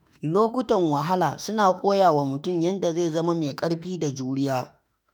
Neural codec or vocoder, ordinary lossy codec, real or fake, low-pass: autoencoder, 48 kHz, 32 numbers a frame, DAC-VAE, trained on Japanese speech; none; fake; none